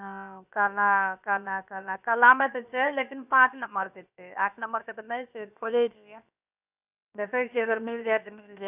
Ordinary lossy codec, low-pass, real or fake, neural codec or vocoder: none; 3.6 kHz; fake; codec, 16 kHz, 0.7 kbps, FocalCodec